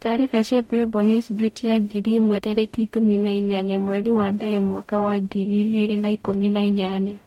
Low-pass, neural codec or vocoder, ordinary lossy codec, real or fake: 19.8 kHz; codec, 44.1 kHz, 0.9 kbps, DAC; MP3, 64 kbps; fake